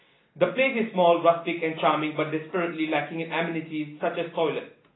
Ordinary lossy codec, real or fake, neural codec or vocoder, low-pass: AAC, 16 kbps; real; none; 7.2 kHz